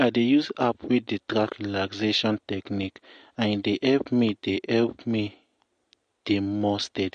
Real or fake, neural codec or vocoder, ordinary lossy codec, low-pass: real; none; MP3, 48 kbps; 9.9 kHz